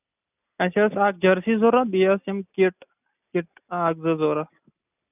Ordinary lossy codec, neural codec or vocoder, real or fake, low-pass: none; none; real; 3.6 kHz